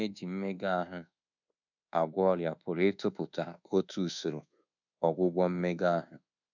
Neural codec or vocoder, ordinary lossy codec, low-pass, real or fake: codec, 24 kHz, 1.2 kbps, DualCodec; none; 7.2 kHz; fake